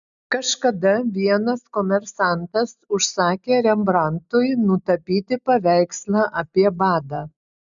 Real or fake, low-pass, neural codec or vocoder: real; 7.2 kHz; none